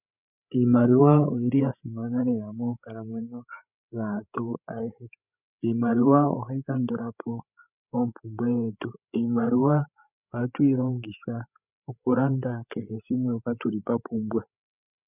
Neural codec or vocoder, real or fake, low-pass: codec, 16 kHz, 8 kbps, FreqCodec, larger model; fake; 3.6 kHz